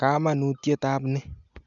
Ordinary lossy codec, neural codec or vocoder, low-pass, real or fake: none; none; 7.2 kHz; real